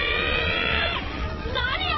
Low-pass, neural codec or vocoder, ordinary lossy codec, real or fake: 7.2 kHz; vocoder, 22.05 kHz, 80 mel bands, Vocos; MP3, 24 kbps; fake